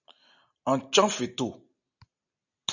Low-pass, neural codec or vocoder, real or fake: 7.2 kHz; none; real